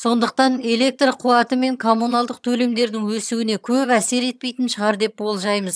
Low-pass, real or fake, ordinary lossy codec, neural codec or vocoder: none; fake; none; vocoder, 22.05 kHz, 80 mel bands, HiFi-GAN